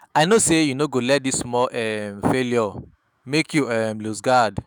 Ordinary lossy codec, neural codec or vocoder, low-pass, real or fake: none; autoencoder, 48 kHz, 128 numbers a frame, DAC-VAE, trained on Japanese speech; none; fake